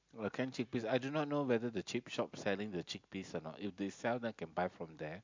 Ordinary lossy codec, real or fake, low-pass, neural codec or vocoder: MP3, 64 kbps; real; 7.2 kHz; none